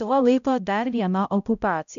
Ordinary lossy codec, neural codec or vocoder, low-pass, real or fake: MP3, 96 kbps; codec, 16 kHz, 0.5 kbps, X-Codec, HuBERT features, trained on balanced general audio; 7.2 kHz; fake